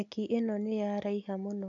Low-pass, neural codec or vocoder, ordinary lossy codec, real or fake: 7.2 kHz; none; AAC, 64 kbps; real